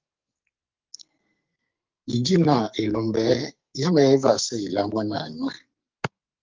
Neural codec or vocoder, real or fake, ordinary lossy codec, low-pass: codec, 44.1 kHz, 2.6 kbps, SNAC; fake; Opus, 24 kbps; 7.2 kHz